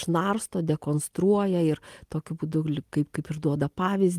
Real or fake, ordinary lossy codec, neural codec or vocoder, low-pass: real; Opus, 24 kbps; none; 14.4 kHz